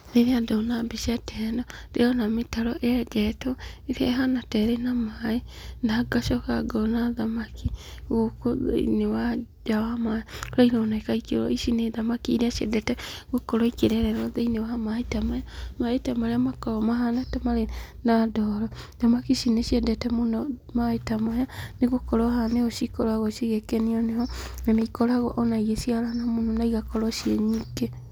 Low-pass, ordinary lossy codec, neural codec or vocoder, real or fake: none; none; none; real